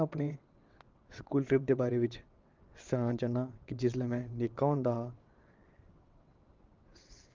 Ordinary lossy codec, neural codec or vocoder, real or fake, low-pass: Opus, 24 kbps; vocoder, 22.05 kHz, 80 mel bands, WaveNeXt; fake; 7.2 kHz